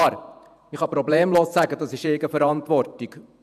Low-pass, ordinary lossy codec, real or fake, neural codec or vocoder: 14.4 kHz; none; fake; vocoder, 48 kHz, 128 mel bands, Vocos